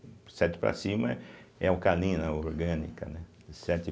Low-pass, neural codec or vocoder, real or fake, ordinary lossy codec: none; none; real; none